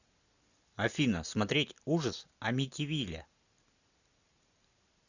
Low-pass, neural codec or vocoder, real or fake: 7.2 kHz; none; real